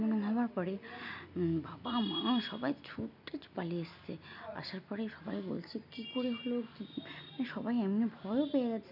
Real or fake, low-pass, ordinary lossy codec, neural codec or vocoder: real; 5.4 kHz; none; none